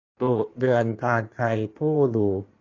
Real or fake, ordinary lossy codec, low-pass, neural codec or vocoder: fake; AAC, 32 kbps; 7.2 kHz; codec, 16 kHz in and 24 kHz out, 1.1 kbps, FireRedTTS-2 codec